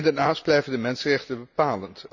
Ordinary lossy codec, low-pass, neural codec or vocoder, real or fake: none; 7.2 kHz; none; real